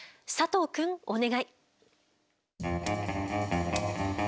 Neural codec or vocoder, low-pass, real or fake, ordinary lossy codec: none; none; real; none